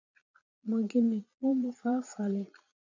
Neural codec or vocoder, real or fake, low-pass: vocoder, 22.05 kHz, 80 mel bands, Vocos; fake; 7.2 kHz